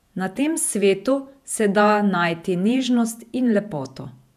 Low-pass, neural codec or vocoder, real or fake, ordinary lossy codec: 14.4 kHz; vocoder, 48 kHz, 128 mel bands, Vocos; fake; none